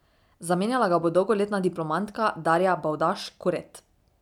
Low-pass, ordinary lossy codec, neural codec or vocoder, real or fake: 19.8 kHz; none; none; real